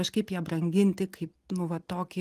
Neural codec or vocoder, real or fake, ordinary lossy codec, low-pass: none; real; Opus, 24 kbps; 14.4 kHz